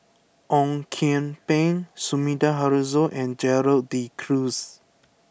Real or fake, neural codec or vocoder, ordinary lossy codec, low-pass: real; none; none; none